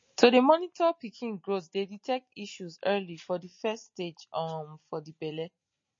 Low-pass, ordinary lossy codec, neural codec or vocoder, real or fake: 7.2 kHz; MP3, 32 kbps; none; real